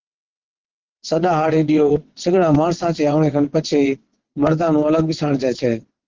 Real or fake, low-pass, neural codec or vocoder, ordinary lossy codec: fake; 7.2 kHz; vocoder, 22.05 kHz, 80 mel bands, WaveNeXt; Opus, 16 kbps